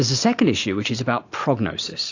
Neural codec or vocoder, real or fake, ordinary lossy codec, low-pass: none; real; AAC, 48 kbps; 7.2 kHz